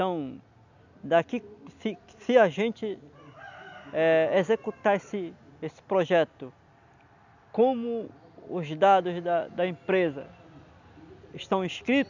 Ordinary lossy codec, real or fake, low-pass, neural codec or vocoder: none; real; 7.2 kHz; none